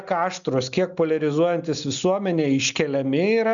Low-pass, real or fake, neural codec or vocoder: 7.2 kHz; real; none